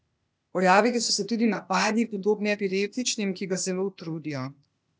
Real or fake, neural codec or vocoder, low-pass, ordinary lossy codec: fake; codec, 16 kHz, 0.8 kbps, ZipCodec; none; none